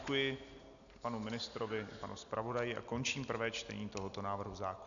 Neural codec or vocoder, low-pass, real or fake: none; 7.2 kHz; real